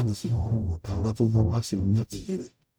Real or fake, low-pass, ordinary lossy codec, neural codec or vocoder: fake; none; none; codec, 44.1 kHz, 0.9 kbps, DAC